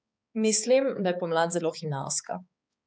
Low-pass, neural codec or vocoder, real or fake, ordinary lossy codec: none; codec, 16 kHz, 4 kbps, X-Codec, HuBERT features, trained on balanced general audio; fake; none